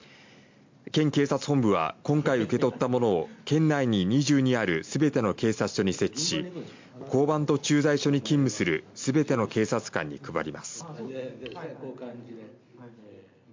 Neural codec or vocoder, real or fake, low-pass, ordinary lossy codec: none; real; 7.2 kHz; MP3, 48 kbps